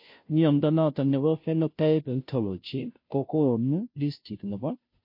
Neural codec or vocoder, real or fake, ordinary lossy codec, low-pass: codec, 16 kHz, 0.5 kbps, FunCodec, trained on Chinese and English, 25 frames a second; fake; MP3, 32 kbps; 5.4 kHz